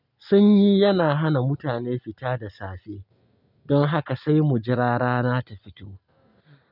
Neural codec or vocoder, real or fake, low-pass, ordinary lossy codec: none; real; 5.4 kHz; none